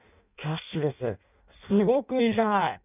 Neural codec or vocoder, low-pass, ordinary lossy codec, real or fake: codec, 16 kHz in and 24 kHz out, 0.6 kbps, FireRedTTS-2 codec; 3.6 kHz; none; fake